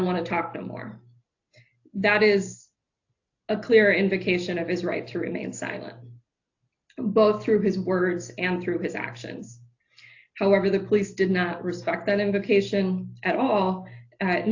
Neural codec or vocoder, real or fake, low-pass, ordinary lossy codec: none; real; 7.2 kHz; AAC, 48 kbps